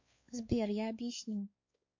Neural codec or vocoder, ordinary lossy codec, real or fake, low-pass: codec, 16 kHz, 4 kbps, X-Codec, WavLM features, trained on Multilingual LibriSpeech; MP3, 48 kbps; fake; 7.2 kHz